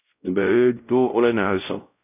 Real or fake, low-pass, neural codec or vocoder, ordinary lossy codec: fake; 3.6 kHz; codec, 16 kHz, 0.5 kbps, X-Codec, WavLM features, trained on Multilingual LibriSpeech; none